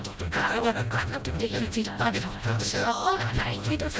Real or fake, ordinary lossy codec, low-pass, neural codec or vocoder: fake; none; none; codec, 16 kHz, 0.5 kbps, FreqCodec, smaller model